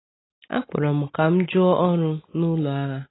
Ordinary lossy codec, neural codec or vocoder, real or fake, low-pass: AAC, 16 kbps; none; real; 7.2 kHz